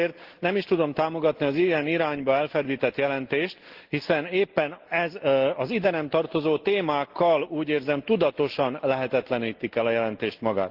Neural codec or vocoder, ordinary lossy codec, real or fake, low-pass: none; Opus, 16 kbps; real; 5.4 kHz